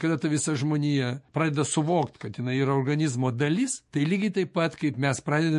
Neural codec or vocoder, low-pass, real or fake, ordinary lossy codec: autoencoder, 48 kHz, 128 numbers a frame, DAC-VAE, trained on Japanese speech; 14.4 kHz; fake; MP3, 48 kbps